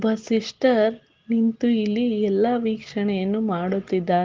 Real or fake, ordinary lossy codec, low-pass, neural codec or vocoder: real; Opus, 16 kbps; 7.2 kHz; none